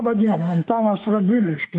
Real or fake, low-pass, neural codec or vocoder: fake; 10.8 kHz; autoencoder, 48 kHz, 32 numbers a frame, DAC-VAE, trained on Japanese speech